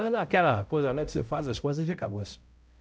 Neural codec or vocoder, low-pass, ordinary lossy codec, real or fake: codec, 16 kHz, 0.5 kbps, X-Codec, HuBERT features, trained on balanced general audio; none; none; fake